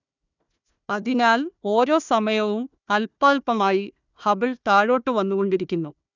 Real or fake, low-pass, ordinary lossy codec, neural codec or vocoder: fake; 7.2 kHz; none; codec, 16 kHz, 1 kbps, FunCodec, trained on Chinese and English, 50 frames a second